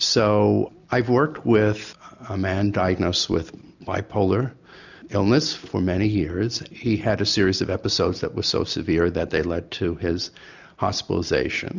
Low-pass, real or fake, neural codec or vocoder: 7.2 kHz; real; none